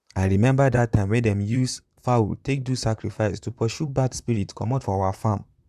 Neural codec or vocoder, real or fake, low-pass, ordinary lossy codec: vocoder, 44.1 kHz, 128 mel bands, Pupu-Vocoder; fake; 14.4 kHz; none